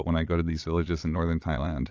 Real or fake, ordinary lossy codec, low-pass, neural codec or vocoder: fake; AAC, 48 kbps; 7.2 kHz; vocoder, 22.05 kHz, 80 mel bands, Vocos